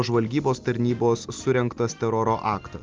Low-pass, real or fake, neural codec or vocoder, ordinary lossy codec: 7.2 kHz; real; none; Opus, 32 kbps